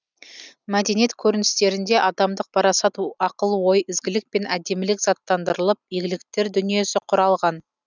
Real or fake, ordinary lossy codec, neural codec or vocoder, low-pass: real; none; none; 7.2 kHz